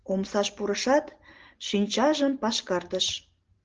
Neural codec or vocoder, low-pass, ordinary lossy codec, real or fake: none; 7.2 kHz; Opus, 16 kbps; real